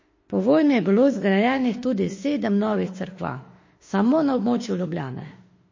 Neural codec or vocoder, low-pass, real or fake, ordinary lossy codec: autoencoder, 48 kHz, 32 numbers a frame, DAC-VAE, trained on Japanese speech; 7.2 kHz; fake; MP3, 32 kbps